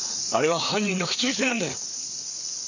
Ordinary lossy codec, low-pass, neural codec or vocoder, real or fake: none; 7.2 kHz; codec, 16 kHz, 4 kbps, FreqCodec, larger model; fake